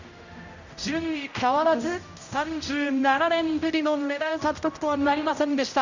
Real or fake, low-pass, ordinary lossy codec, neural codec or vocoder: fake; 7.2 kHz; Opus, 64 kbps; codec, 16 kHz, 0.5 kbps, X-Codec, HuBERT features, trained on general audio